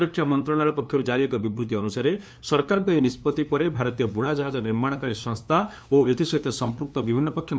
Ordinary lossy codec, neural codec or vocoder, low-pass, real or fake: none; codec, 16 kHz, 2 kbps, FunCodec, trained on LibriTTS, 25 frames a second; none; fake